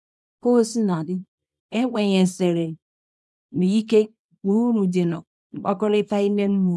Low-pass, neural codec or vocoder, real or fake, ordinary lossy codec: none; codec, 24 kHz, 0.9 kbps, WavTokenizer, small release; fake; none